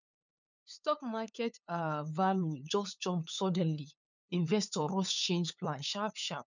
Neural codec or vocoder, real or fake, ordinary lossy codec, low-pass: codec, 16 kHz, 8 kbps, FunCodec, trained on LibriTTS, 25 frames a second; fake; none; 7.2 kHz